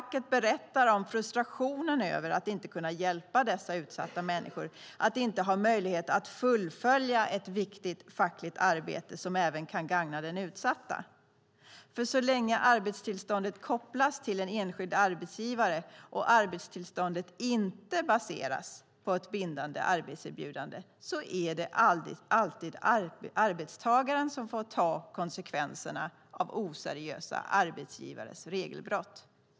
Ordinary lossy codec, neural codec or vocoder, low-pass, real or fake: none; none; none; real